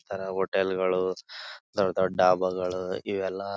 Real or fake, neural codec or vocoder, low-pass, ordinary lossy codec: real; none; none; none